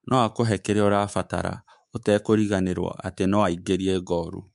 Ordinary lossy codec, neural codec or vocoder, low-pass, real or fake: MP3, 64 kbps; codec, 24 kHz, 3.1 kbps, DualCodec; 10.8 kHz; fake